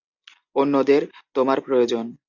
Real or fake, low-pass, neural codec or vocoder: real; 7.2 kHz; none